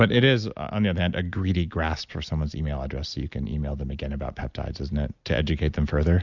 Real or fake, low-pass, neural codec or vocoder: real; 7.2 kHz; none